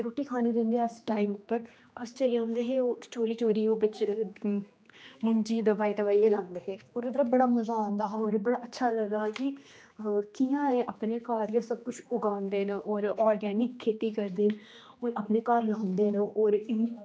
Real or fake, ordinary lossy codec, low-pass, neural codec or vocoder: fake; none; none; codec, 16 kHz, 2 kbps, X-Codec, HuBERT features, trained on general audio